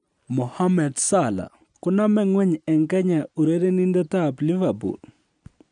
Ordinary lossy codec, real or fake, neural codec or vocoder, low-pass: none; real; none; 9.9 kHz